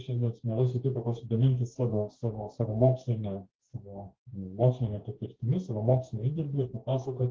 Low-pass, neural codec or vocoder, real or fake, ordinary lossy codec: 7.2 kHz; codec, 44.1 kHz, 2.6 kbps, DAC; fake; Opus, 16 kbps